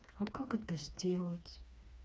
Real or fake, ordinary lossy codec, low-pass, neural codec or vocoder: fake; none; none; codec, 16 kHz, 2 kbps, FreqCodec, smaller model